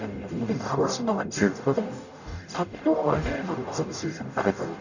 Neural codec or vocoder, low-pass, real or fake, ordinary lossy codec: codec, 44.1 kHz, 0.9 kbps, DAC; 7.2 kHz; fake; none